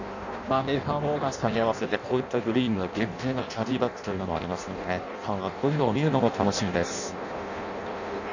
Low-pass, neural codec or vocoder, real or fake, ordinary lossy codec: 7.2 kHz; codec, 16 kHz in and 24 kHz out, 0.6 kbps, FireRedTTS-2 codec; fake; none